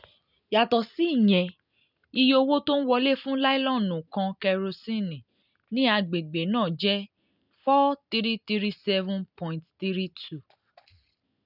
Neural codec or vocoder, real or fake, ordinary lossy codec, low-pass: none; real; none; 5.4 kHz